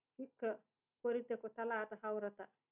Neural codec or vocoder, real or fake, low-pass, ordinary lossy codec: none; real; 3.6 kHz; none